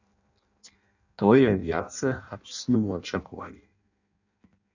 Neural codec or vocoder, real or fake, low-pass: codec, 16 kHz in and 24 kHz out, 0.6 kbps, FireRedTTS-2 codec; fake; 7.2 kHz